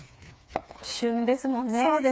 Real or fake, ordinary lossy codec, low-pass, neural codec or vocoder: fake; none; none; codec, 16 kHz, 4 kbps, FreqCodec, smaller model